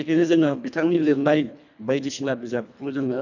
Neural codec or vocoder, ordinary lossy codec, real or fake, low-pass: codec, 24 kHz, 1.5 kbps, HILCodec; none; fake; 7.2 kHz